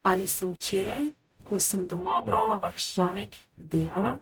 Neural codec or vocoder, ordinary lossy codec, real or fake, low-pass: codec, 44.1 kHz, 0.9 kbps, DAC; none; fake; none